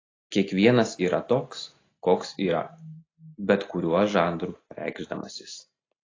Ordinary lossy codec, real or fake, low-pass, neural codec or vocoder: AAC, 32 kbps; real; 7.2 kHz; none